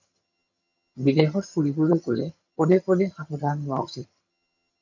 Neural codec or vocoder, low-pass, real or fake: vocoder, 22.05 kHz, 80 mel bands, HiFi-GAN; 7.2 kHz; fake